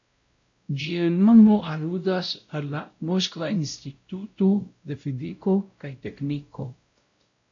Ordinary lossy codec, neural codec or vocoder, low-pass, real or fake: AAC, 64 kbps; codec, 16 kHz, 0.5 kbps, X-Codec, WavLM features, trained on Multilingual LibriSpeech; 7.2 kHz; fake